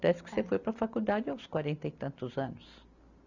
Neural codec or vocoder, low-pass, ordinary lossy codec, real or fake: none; 7.2 kHz; none; real